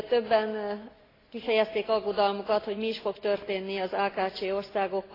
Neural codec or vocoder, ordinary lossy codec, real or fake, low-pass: none; AAC, 24 kbps; real; 5.4 kHz